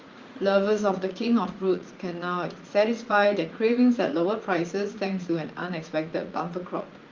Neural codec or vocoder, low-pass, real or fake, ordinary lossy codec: vocoder, 44.1 kHz, 80 mel bands, Vocos; 7.2 kHz; fake; Opus, 32 kbps